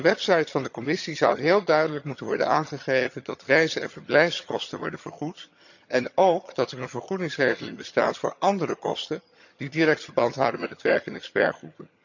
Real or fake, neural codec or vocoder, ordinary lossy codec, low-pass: fake; vocoder, 22.05 kHz, 80 mel bands, HiFi-GAN; none; 7.2 kHz